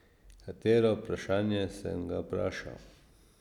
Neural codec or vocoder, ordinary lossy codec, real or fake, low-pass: none; none; real; 19.8 kHz